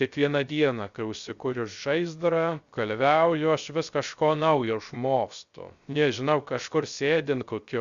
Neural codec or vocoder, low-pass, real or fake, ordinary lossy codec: codec, 16 kHz, 0.3 kbps, FocalCodec; 7.2 kHz; fake; Opus, 64 kbps